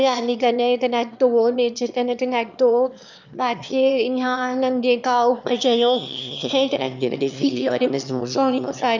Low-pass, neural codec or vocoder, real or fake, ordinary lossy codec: 7.2 kHz; autoencoder, 22.05 kHz, a latent of 192 numbers a frame, VITS, trained on one speaker; fake; none